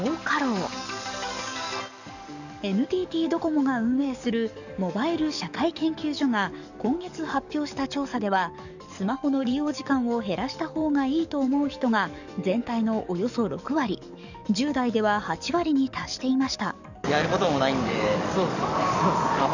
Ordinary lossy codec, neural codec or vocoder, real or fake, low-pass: none; codec, 44.1 kHz, 7.8 kbps, DAC; fake; 7.2 kHz